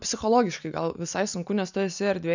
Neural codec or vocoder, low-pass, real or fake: none; 7.2 kHz; real